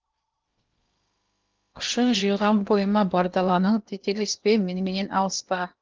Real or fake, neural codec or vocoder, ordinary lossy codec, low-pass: fake; codec, 16 kHz in and 24 kHz out, 0.8 kbps, FocalCodec, streaming, 65536 codes; Opus, 32 kbps; 7.2 kHz